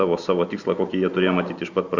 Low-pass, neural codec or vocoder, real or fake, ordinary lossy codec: 7.2 kHz; none; real; Opus, 64 kbps